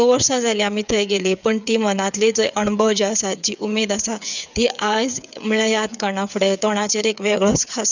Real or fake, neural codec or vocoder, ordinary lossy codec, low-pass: fake; codec, 16 kHz, 8 kbps, FreqCodec, smaller model; none; 7.2 kHz